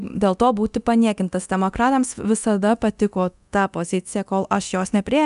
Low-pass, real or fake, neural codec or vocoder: 10.8 kHz; fake; codec, 24 kHz, 0.9 kbps, DualCodec